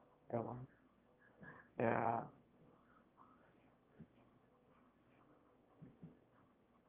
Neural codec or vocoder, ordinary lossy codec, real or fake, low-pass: autoencoder, 22.05 kHz, a latent of 192 numbers a frame, VITS, trained on one speaker; Opus, 24 kbps; fake; 3.6 kHz